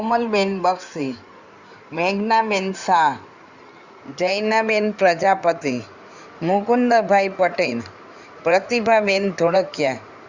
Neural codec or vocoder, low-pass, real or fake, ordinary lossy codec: codec, 16 kHz in and 24 kHz out, 2.2 kbps, FireRedTTS-2 codec; 7.2 kHz; fake; Opus, 64 kbps